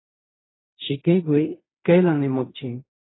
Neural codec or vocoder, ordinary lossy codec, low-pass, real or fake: codec, 16 kHz in and 24 kHz out, 0.4 kbps, LongCat-Audio-Codec, fine tuned four codebook decoder; AAC, 16 kbps; 7.2 kHz; fake